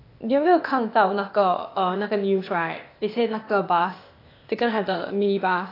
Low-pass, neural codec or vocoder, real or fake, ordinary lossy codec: 5.4 kHz; codec, 16 kHz, 0.8 kbps, ZipCodec; fake; AAC, 32 kbps